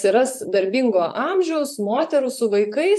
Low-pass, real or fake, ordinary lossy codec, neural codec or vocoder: 14.4 kHz; fake; AAC, 96 kbps; vocoder, 44.1 kHz, 128 mel bands, Pupu-Vocoder